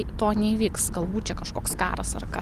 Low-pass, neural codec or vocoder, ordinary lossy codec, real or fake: 14.4 kHz; none; Opus, 24 kbps; real